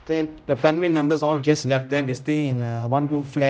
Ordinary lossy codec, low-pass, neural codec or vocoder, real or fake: none; none; codec, 16 kHz, 0.5 kbps, X-Codec, HuBERT features, trained on general audio; fake